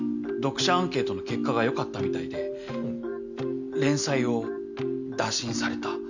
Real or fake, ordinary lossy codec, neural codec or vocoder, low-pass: real; none; none; 7.2 kHz